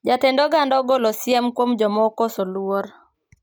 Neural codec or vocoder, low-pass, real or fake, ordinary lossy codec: none; none; real; none